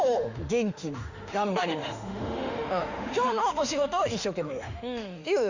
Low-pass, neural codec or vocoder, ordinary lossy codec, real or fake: 7.2 kHz; autoencoder, 48 kHz, 32 numbers a frame, DAC-VAE, trained on Japanese speech; Opus, 64 kbps; fake